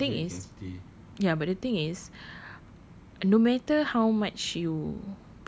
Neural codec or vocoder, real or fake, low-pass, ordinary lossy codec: none; real; none; none